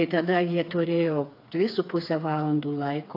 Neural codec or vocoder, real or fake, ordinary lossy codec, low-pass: codec, 16 kHz, 4 kbps, FreqCodec, smaller model; fake; AAC, 32 kbps; 5.4 kHz